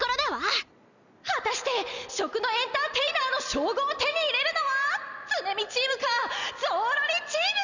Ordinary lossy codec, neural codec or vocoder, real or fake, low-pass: none; none; real; 7.2 kHz